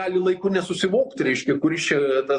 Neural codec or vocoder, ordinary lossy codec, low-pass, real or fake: none; MP3, 48 kbps; 10.8 kHz; real